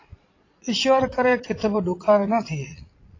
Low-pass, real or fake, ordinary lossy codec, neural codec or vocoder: 7.2 kHz; fake; AAC, 32 kbps; vocoder, 22.05 kHz, 80 mel bands, Vocos